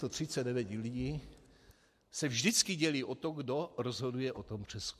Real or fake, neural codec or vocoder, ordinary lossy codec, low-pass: real; none; MP3, 64 kbps; 14.4 kHz